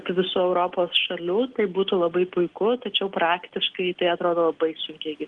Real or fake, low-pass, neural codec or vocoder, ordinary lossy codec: real; 9.9 kHz; none; Opus, 16 kbps